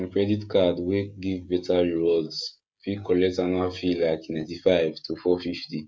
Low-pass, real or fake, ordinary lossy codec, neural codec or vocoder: none; fake; none; codec, 16 kHz, 16 kbps, FreqCodec, smaller model